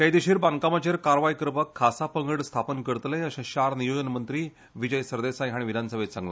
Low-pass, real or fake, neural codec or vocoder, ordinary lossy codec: none; real; none; none